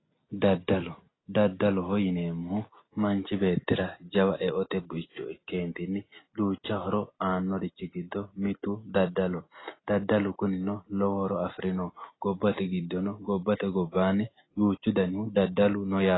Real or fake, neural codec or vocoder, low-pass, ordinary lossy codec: real; none; 7.2 kHz; AAC, 16 kbps